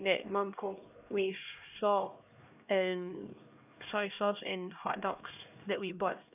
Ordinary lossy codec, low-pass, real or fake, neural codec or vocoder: none; 3.6 kHz; fake; codec, 16 kHz, 1 kbps, X-Codec, HuBERT features, trained on LibriSpeech